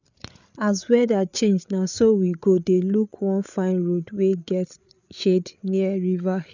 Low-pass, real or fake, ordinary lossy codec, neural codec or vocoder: 7.2 kHz; fake; none; codec, 16 kHz, 8 kbps, FreqCodec, larger model